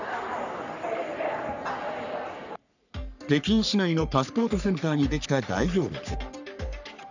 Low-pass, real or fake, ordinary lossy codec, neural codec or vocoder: 7.2 kHz; fake; none; codec, 44.1 kHz, 3.4 kbps, Pupu-Codec